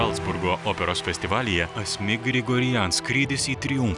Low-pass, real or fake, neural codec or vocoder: 10.8 kHz; real; none